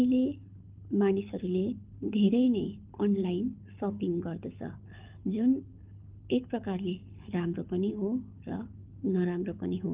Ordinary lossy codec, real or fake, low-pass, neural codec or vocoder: Opus, 24 kbps; fake; 3.6 kHz; codec, 24 kHz, 6 kbps, HILCodec